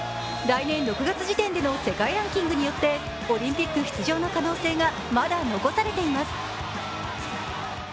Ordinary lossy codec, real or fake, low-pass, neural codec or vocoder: none; real; none; none